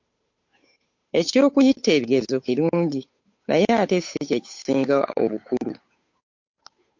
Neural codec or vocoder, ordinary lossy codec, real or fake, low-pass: codec, 16 kHz, 8 kbps, FunCodec, trained on Chinese and English, 25 frames a second; MP3, 48 kbps; fake; 7.2 kHz